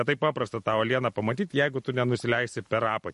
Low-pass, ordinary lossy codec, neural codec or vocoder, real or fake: 14.4 kHz; MP3, 48 kbps; none; real